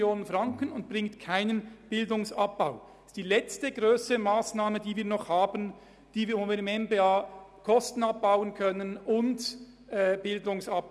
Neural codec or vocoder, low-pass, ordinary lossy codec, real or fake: none; none; none; real